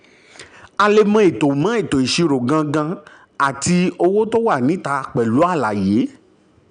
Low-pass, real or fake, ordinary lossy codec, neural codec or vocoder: 9.9 kHz; real; none; none